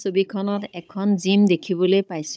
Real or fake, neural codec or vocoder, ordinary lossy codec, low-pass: fake; codec, 16 kHz, 16 kbps, FunCodec, trained on Chinese and English, 50 frames a second; none; none